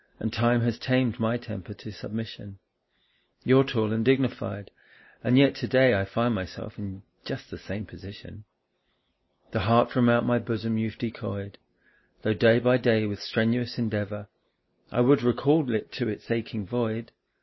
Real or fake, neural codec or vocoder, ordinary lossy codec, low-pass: real; none; MP3, 24 kbps; 7.2 kHz